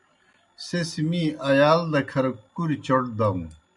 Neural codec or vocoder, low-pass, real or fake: none; 10.8 kHz; real